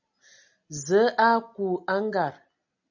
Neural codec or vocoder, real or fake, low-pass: none; real; 7.2 kHz